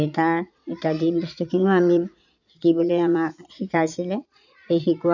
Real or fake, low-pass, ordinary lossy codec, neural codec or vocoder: fake; 7.2 kHz; none; vocoder, 22.05 kHz, 80 mel bands, Vocos